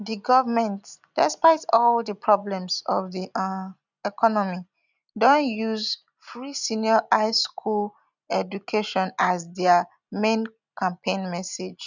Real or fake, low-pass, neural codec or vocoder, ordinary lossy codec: real; 7.2 kHz; none; none